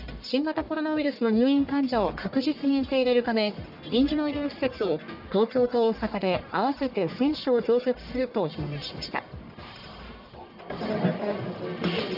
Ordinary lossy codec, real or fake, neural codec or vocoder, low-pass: none; fake; codec, 44.1 kHz, 1.7 kbps, Pupu-Codec; 5.4 kHz